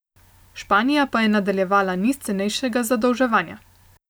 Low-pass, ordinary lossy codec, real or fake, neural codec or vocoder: none; none; real; none